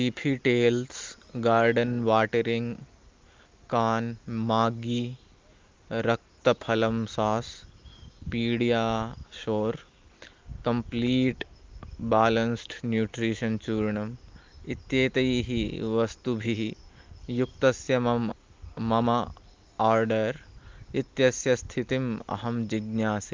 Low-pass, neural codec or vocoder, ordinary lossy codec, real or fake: 7.2 kHz; none; Opus, 32 kbps; real